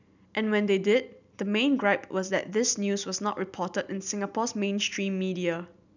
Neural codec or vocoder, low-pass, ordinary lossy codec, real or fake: none; 7.2 kHz; none; real